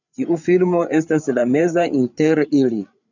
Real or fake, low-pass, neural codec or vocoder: fake; 7.2 kHz; codec, 16 kHz, 8 kbps, FreqCodec, larger model